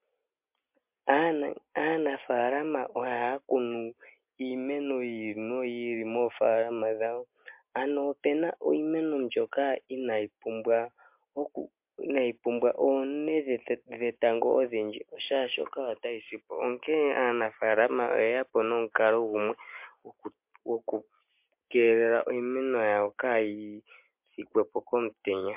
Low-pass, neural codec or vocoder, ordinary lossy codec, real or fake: 3.6 kHz; none; MP3, 32 kbps; real